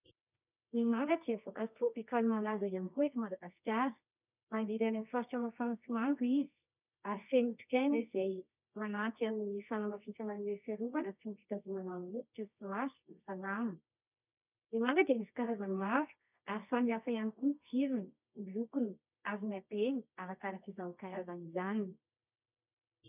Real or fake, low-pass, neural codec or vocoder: fake; 3.6 kHz; codec, 24 kHz, 0.9 kbps, WavTokenizer, medium music audio release